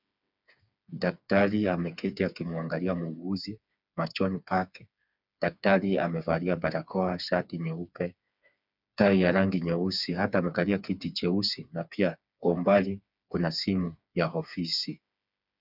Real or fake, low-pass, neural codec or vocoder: fake; 5.4 kHz; codec, 16 kHz, 4 kbps, FreqCodec, smaller model